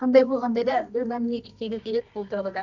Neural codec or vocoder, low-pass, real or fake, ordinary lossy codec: codec, 24 kHz, 0.9 kbps, WavTokenizer, medium music audio release; 7.2 kHz; fake; none